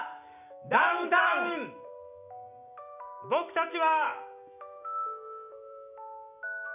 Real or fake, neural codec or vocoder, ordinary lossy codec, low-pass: fake; autoencoder, 48 kHz, 128 numbers a frame, DAC-VAE, trained on Japanese speech; none; 3.6 kHz